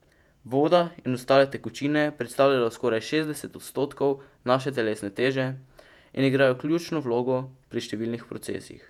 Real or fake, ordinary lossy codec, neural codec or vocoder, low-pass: fake; none; vocoder, 48 kHz, 128 mel bands, Vocos; 19.8 kHz